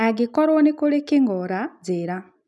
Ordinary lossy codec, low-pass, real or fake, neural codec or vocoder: none; none; real; none